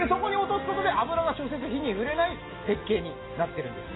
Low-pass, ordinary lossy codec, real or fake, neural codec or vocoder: 7.2 kHz; AAC, 16 kbps; real; none